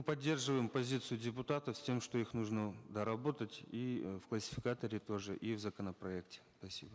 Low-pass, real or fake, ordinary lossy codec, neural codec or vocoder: none; real; none; none